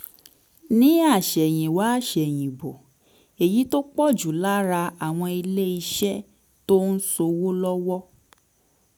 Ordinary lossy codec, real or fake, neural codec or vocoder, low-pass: none; real; none; none